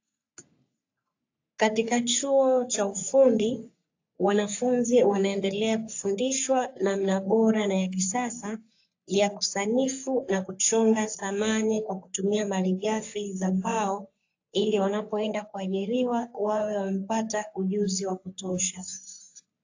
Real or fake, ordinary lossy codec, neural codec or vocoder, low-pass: fake; AAC, 48 kbps; codec, 44.1 kHz, 3.4 kbps, Pupu-Codec; 7.2 kHz